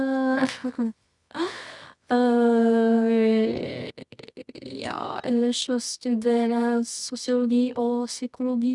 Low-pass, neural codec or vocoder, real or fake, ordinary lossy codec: 10.8 kHz; codec, 24 kHz, 0.9 kbps, WavTokenizer, medium music audio release; fake; none